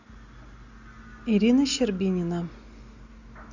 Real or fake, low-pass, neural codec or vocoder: real; 7.2 kHz; none